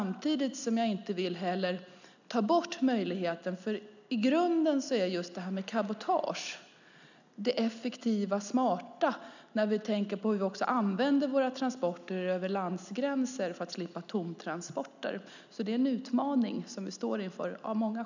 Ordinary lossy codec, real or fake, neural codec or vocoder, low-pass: none; real; none; 7.2 kHz